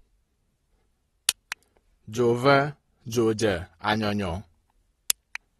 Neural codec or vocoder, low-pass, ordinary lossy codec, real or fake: none; 19.8 kHz; AAC, 32 kbps; real